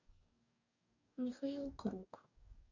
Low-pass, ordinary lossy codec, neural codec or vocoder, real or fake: 7.2 kHz; none; codec, 44.1 kHz, 2.6 kbps, DAC; fake